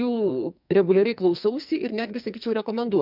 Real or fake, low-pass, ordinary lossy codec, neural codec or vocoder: fake; 5.4 kHz; MP3, 48 kbps; codec, 44.1 kHz, 2.6 kbps, SNAC